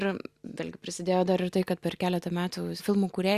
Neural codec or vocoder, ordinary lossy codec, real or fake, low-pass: none; Opus, 64 kbps; real; 14.4 kHz